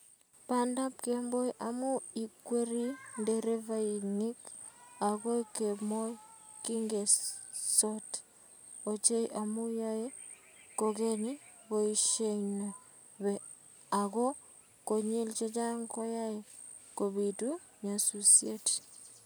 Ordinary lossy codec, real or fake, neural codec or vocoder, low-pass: none; real; none; none